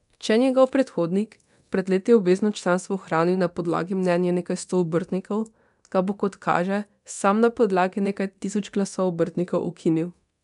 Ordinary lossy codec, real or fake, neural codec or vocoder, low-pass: none; fake; codec, 24 kHz, 0.9 kbps, DualCodec; 10.8 kHz